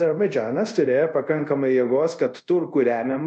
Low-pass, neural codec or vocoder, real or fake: 9.9 kHz; codec, 24 kHz, 0.5 kbps, DualCodec; fake